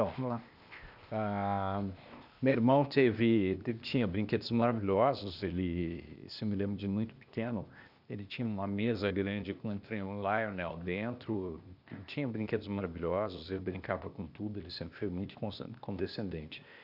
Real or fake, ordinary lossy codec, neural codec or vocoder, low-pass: fake; none; codec, 16 kHz, 0.8 kbps, ZipCodec; 5.4 kHz